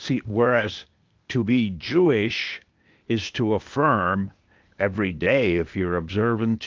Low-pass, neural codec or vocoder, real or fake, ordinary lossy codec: 7.2 kHz; codec, 16 kHz, 0.8 kbps, ZipCodec; fake; Opus, 24 kbps